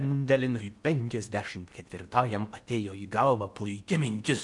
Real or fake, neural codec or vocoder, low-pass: fake; codec, 16 kHz in and 24 kHz out, 0.8 kbps, FocalCodec, streaming, 65536 codes; 10.8 kHz